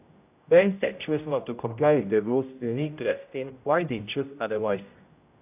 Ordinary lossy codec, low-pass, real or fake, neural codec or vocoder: AAC, 32 kbps; 3.6 kHz; fake; codec, 16 kHz, 0.5 kbps, X-Codec, HuBERT features, trained on general audio